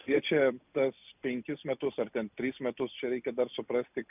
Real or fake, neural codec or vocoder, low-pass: real; none; 3.6 kHz